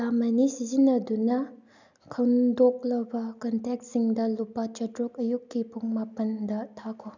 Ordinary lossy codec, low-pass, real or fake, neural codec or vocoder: none; 7.2 kHz; real; none